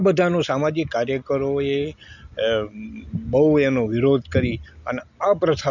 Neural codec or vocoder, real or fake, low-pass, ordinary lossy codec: none; real; 7.2 kHz; none